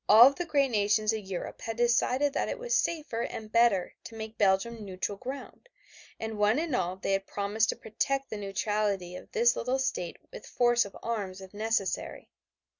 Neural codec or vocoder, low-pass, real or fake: none; 7.2 kHz; real